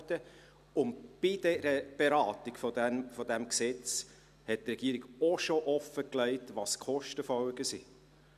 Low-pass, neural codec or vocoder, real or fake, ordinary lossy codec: 14.4 kHz; none; real; none